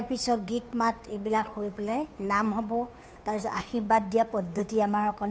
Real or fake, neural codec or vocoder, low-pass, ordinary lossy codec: fake; codec, 16 kHz, 2 kbps, FunCodec, trained on Chinese and English, 25 frames a second; none; none